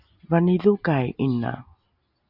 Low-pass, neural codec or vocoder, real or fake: 5.4 kHz; none; real